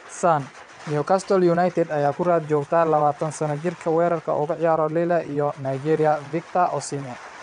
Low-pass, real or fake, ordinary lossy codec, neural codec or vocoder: 9.9 kHz; fake; none; vocoder, 22.05 kHz, 80 mel bands, WaveNeXt